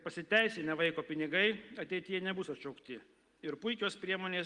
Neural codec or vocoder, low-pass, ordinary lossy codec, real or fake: none; 10.8 kHz; Opus, 24 kbps; real